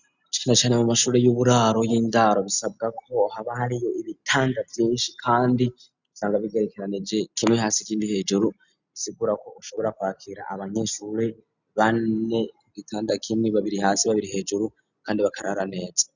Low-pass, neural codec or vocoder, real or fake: 7.2 kHz; none; real